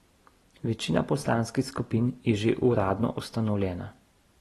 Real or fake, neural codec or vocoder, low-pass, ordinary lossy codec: real; none; 19.8 kHz; AAC, 32 kbps